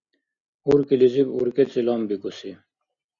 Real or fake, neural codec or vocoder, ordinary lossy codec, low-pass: real; none; Opus, 64 kbps; 7.2 kHz